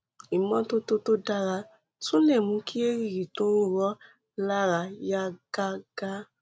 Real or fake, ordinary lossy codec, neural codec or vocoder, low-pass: real; none; none; none